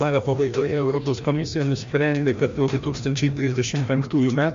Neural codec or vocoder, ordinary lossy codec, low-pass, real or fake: codec, 16 kHz, 1 kbps, FreqCodec, larger model; MP3, 48 kbps; 7.2 kHz; fake